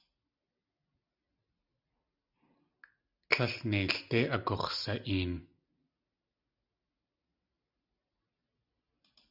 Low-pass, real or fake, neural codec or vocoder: 5.4 kHz; real; none